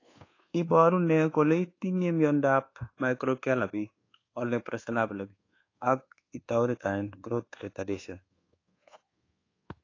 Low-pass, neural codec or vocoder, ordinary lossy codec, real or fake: 7.2 kHz; codec, 24 kHz, 1.2 kbps, DualCodec; AAC, 32 kbps; fake